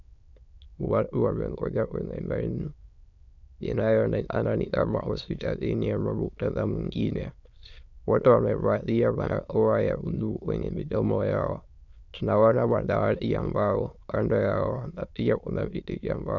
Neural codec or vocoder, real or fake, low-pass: autoencoder, 22.05 kHz, a latent of 192 numbers a frame, VITS, trained on many speakers; fake; 7.2 kHz